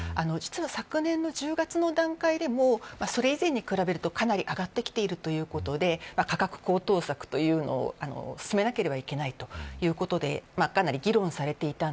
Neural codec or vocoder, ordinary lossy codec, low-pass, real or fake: none; none; none; real